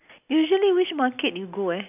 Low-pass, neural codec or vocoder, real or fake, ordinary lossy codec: 3.6 kHz; none; real; none